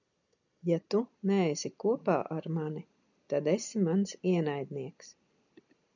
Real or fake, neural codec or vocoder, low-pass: real; none; 7.2 kHz